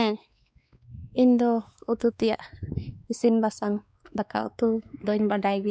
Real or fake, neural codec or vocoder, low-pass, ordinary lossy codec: fake; codec, 16 kHz, 2 kbps, X-Codec, WavLM features, trained on Multilingual LibriSpeech; none; none